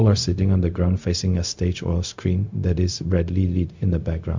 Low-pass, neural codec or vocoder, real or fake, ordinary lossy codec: 7.2 kHz; codec, 16 kHz, 0.4 kbps, LongCat-Audio-Codec; fake; MP3, 64 kbps